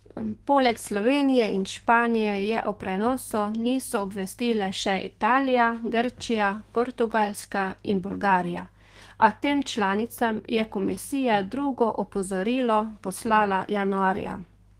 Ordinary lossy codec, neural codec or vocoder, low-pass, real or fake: Opus, 24 kbps; codec, 32 kHz, 1.9 kbps, SNAC; 14.4 kHz; fake